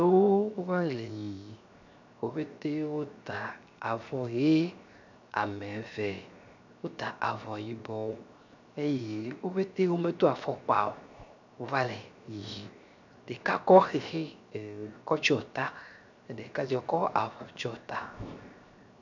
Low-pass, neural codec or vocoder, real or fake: 7.2 kHz; codec, 16 kHz, 0.7 kbps, FocalCodec; fake